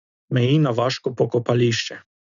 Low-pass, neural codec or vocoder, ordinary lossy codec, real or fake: 7.2 kHz; none; none; real